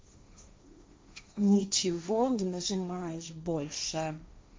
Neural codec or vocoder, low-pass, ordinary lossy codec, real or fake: codec, 16 kHz, 1.1 kbps, Voila-Tokenizer; none; none; fake